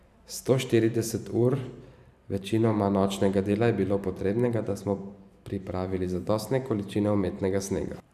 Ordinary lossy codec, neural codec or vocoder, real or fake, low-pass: none; vocoder, 44.1 kHz, 128 mel bands every 512 samples, BigVGAN v2; fake; 14.4 kHz